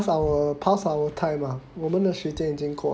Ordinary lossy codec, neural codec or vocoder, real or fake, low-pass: none; none; real; none